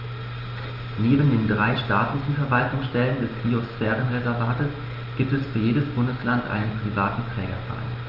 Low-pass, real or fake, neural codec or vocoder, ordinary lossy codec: 5.4 kHz; real; none; Opus, 24 kbps